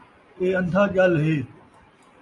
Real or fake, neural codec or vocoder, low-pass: real; none; 10.8 kHz